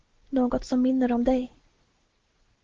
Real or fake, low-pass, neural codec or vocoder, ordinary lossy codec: real; 7.2 kHz; none; Opus, 16 kbps